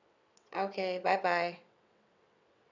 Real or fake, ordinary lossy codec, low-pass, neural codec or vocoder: fake; none; 7.2 kHz; vocoder, 22.05 kHz, 80 mel bands, WaveNeXt